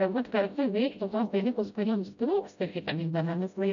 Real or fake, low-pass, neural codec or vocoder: fake; 7.2 kHz; codec, 16 kHz, 0.5 kbps, FreqCodec, smaller model